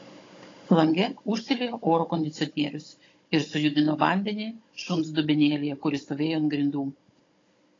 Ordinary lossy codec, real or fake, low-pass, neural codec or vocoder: AAC, 32 kbps; fake; 7.2 kHz; codec, 16 kHz, 16 kbps, FunCodec, trained on Chinese and English, 50 frames a second